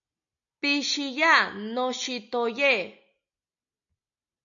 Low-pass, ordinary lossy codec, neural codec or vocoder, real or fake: 7.2 kHz; AAC, 64 kbps; none; real